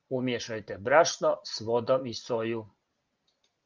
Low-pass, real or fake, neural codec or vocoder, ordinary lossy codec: 7.2 kHz; real; none; Opus, 24 kbps